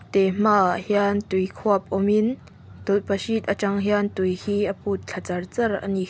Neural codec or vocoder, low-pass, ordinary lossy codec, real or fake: none; none; none; real